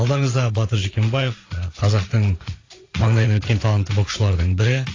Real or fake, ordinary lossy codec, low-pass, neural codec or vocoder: fake; AAC, 32 kbps; 7.2 kHz; vocoder, 44.1 kHz, 80 mel bands, Vocos